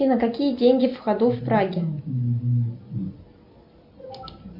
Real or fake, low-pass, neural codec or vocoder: real; 5.4 kHz; none